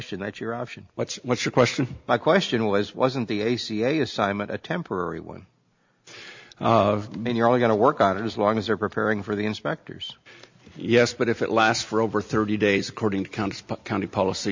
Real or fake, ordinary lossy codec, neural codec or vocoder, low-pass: real; MP3, 64 kbps; none; 7.2 kHz